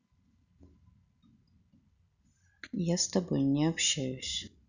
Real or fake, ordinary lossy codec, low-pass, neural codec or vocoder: real; none; 7.2 kHz; none